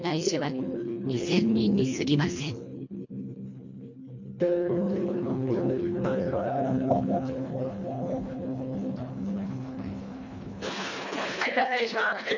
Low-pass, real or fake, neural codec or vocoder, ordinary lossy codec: 7.2 kHz; fake; codec, 24 kHz, 1.5 kbps, HILCodec; MP3, 48 kbps